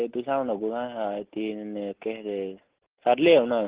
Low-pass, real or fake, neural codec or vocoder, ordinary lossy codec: 3.6 kHz; real; none; Opus, 16 kbps